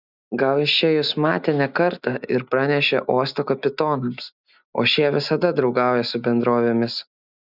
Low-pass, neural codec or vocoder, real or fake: 5.4 kHz; none; real